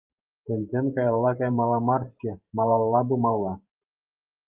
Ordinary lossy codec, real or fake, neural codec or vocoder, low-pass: Opus, 32 kbps; fake; vocoder, 44.1 kHz, 128 mel bands every 512 samples, BigVGAN v2; 3.6 kHz